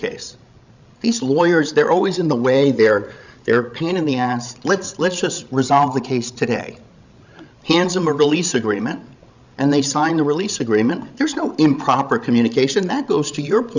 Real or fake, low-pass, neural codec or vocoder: fake; 7.2 kHz; codec, 16 kHz, 16 kbps, FreqCodec, larger model